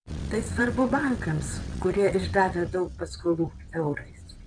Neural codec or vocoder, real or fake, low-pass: vocoder, 22.05 kHz, 80 mel bands, WaveNeXt; fake; 9.9 kHz